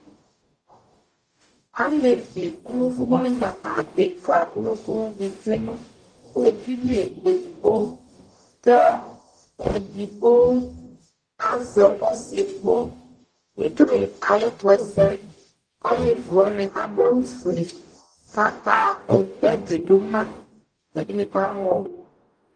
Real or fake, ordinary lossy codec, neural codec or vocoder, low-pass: fake; Opus, 32 kbps; codec, 44.1 kHz, 0.9 kbps, DAC; 9.9 kHz